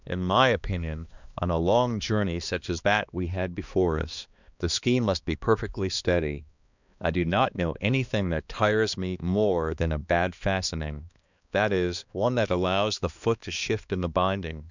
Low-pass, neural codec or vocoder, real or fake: 7.2 kHz; codec, 16 kHz, 2 kbps, X-Codec, HuBERT features, trained on balanced general audio; fake